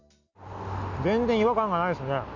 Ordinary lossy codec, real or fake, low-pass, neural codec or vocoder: none; real; 7.2 kHz; none